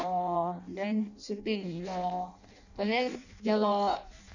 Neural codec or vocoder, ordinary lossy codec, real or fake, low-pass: codec, 16 kHz in and 24 kHz out, 0.6 kbps, FireRedTTS-2 codec; none; fake; 7.2 kHz